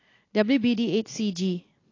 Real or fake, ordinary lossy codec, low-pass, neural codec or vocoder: real; AAC, 32 kbps; 7.2 kHz; none